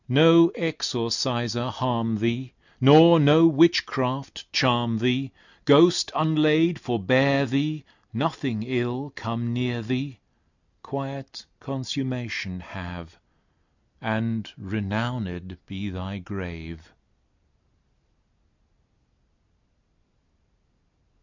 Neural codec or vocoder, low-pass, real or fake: none; 7.2 kHz; real